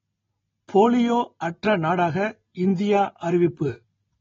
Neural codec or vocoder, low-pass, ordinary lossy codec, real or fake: none; 7.2 kHz; AAC, 24 kbps; real